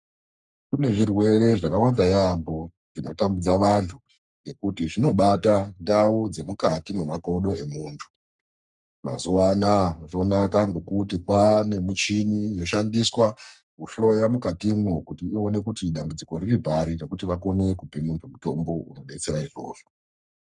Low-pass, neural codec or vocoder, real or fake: 10.8 kHz; codec, 44.1 kHz, 3.4 kbps, Pupu-Codec; fake